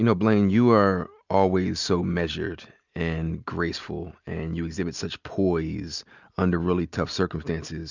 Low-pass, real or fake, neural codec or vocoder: 7.2 kHz; real; none